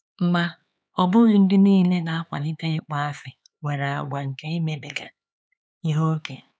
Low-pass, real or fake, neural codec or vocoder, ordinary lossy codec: none; fake; codec, 16 kHz, 4 kbps, X-Codec, HuBERT features, trained on LibriSpeech; none